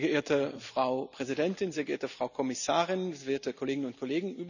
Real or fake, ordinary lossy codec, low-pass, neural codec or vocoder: real; none; 7.2 kHz; none